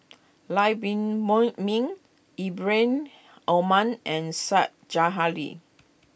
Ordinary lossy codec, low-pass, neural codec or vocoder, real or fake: none; none; none; real